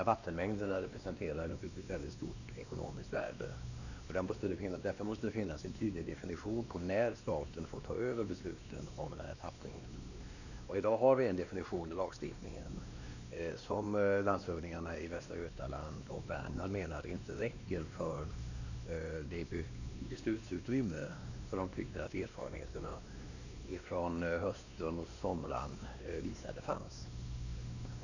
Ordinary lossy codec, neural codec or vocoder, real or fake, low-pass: none; codec, 16 kHz, 2 kbps, X-Codec, WavLM features, trained on Multilingual LibriSpeech; fake; 7.2 kHz